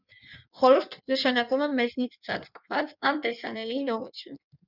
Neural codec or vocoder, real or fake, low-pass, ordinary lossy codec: codec, 16 kHz in and 24 kHz out, 1.1 kbps, FireRedTTS-2 codec; fake; 5.4 kHz; Opus, 64 kbps